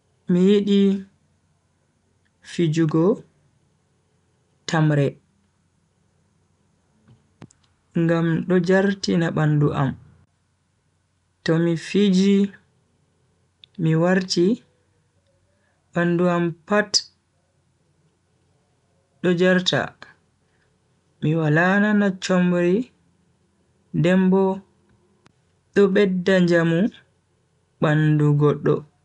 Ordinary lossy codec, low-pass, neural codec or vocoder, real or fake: none; 10.8 kHz; none; real